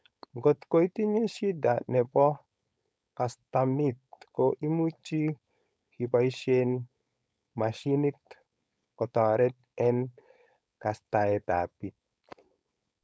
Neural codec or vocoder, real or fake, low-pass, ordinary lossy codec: codec, 16 kHz, 4.8 kbps, FACodec; fake; none; none